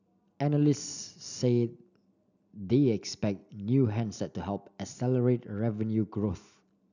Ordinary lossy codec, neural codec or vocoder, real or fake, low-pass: none; none; real; 7.2 kHz